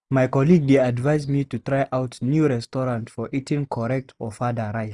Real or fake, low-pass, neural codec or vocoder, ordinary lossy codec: real; none; none; none